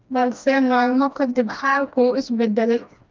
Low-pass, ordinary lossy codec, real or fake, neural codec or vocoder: 7.2 kHz; Opus, 24 kbps; fake; codec, 16 kHz, 1 kbps, FreqCodec, smaller model